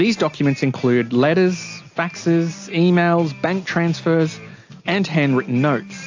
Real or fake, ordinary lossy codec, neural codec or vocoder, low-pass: real; AAC, 48 kbps; none; 7.2 kHz